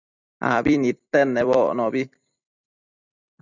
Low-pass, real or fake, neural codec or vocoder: 7.2 kHz; fake; vocoder, 44.1 kHz, 128 mel bands every 256 samples, BigVGAN v2